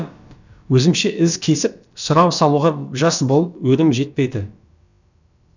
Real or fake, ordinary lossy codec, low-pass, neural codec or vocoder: fake; none; 7.2 kHz; codec, 16 kHz, about 1 kbps, DyCAST, with the encoder's durations